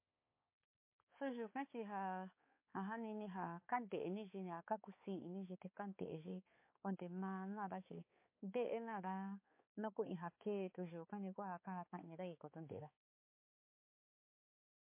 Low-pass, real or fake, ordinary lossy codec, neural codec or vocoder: 3.6 kHz; fake; MP3, 24 kbps; codec, 16 kHz, 4 kbps, X-Codec, HuBERT features, trained on balanced general audio